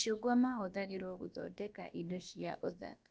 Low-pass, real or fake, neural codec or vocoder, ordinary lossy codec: none; fake; codec, 16 kHz, about 1 kbps, DyCAST, with the encoder's durations; none